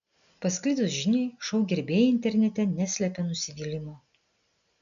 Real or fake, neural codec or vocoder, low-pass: real; none; 7.2 kHz